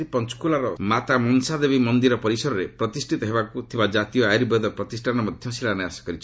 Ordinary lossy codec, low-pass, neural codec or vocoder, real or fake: none; none; none; real